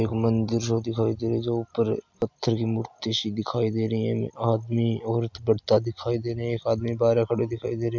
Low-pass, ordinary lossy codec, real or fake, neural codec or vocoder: 7.2 kHz; MP3, 64 kbps; real; none